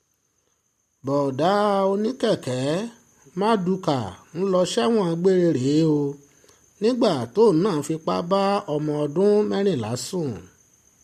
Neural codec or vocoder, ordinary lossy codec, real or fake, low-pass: none; MP3, 64 kbps; real; 14.4 kHz